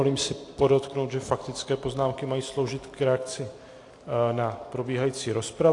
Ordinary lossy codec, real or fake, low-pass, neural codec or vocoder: AAC, 48 kbps; real; 10.8 kHz; none